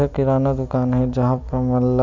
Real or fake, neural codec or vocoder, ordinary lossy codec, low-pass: real; none; MP3, 64 kbps; 7.2 kHz